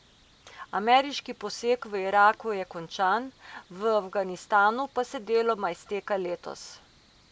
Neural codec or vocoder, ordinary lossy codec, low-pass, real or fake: none; none; none; real